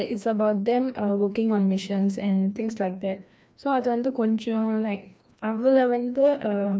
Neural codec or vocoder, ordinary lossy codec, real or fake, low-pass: codec, 16 kHz, 1 kbps, FreqCodec, larger model; none; fake; none